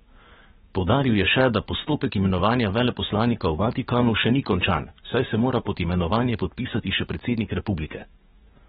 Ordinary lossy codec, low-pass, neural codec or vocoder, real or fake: AAC, 16 kbps; 19.8 kHz; codec, 44.1 kHz, 7.8 kbps, DAC; fake